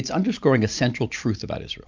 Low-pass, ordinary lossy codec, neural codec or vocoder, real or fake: 7.2 kHz; MP3, 64 kbps; none; real